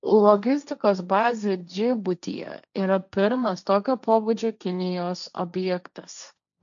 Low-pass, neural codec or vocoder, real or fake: 7.2 kHz; codec, 16 kHz, 1.1 kbps, Voila-Tokenizer; fake